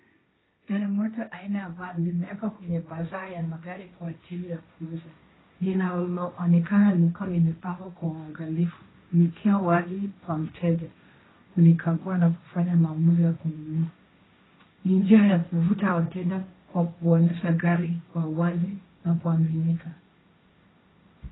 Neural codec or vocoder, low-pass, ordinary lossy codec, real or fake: codec, 16 kHz, 1.1 kbps, Voila-Tokenizer; 7.2 kHz; AAC, 16 kbps; fake